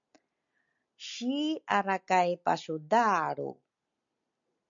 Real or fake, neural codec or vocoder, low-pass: real; none; 7.2 kHz